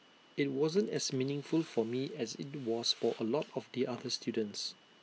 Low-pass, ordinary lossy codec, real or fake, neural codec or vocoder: none; none; real; none